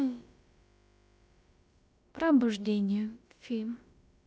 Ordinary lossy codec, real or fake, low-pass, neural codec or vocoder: none; fake; none; codec, 16 kHz, about 1 kbps, DyCAST, with the encoder's durations